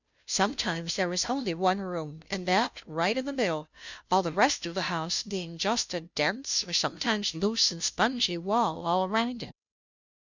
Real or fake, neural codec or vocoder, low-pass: fake; codec, 16 kHz, 0.5 kbps, FunCodec, trained on Chinese and English, 25 frames a second; 7.2 kHz